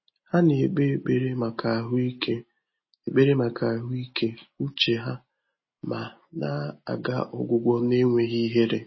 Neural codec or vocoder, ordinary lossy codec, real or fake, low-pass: none; MP3, 24 kbps; real; 7.2 kHz